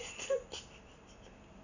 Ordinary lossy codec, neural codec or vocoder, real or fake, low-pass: none; none; real; 7.2 kHz